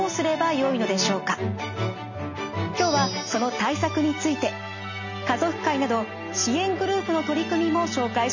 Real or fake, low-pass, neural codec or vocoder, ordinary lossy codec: real; 7.2 kHz; none; none